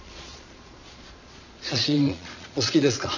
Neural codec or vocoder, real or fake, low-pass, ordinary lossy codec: vocoder, 22.05 kHz, 80 mel bands, Vocos; fake; 7.2 kHz; none